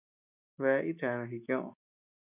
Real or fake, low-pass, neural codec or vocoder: real; 3.6 kHz; none